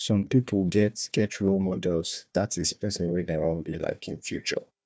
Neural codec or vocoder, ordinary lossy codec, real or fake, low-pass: codec, 16 kHz, 1 kbps, FunCodec, trained on Chinese and English, 50 frames a second; none; fake; none